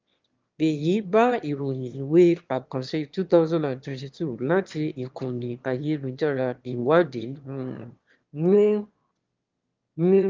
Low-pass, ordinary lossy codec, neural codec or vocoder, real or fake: 7.2 kHz; Opus, 32 kbps; autoencoder, 22.05 kHz, a latent of 192 numbers a frame, VITS, trained on one speaker; fake